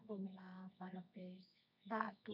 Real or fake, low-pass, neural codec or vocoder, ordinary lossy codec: fake; 5.4 kHz; codec, 24 kHz, 0.9 kbps, WavTokenizer, medium music audio release; none